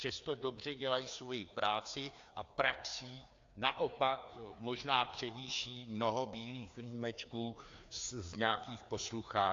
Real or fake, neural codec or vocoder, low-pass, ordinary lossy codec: fake; codec, 16 kHz, 2 kbps, FreqCodec, larger model; 7.2 kHz; AAC, 48 kbps